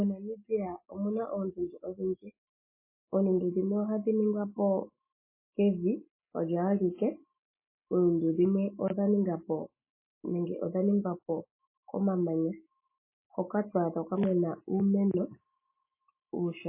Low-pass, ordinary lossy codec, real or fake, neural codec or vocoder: 3.6 kHz; MP3, 16 kbps; real; none